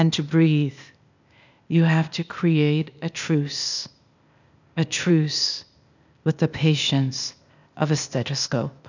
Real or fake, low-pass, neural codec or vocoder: fake; 7.2 kHz; codec, 16 kHz, 0.8 kbps, ZipCodec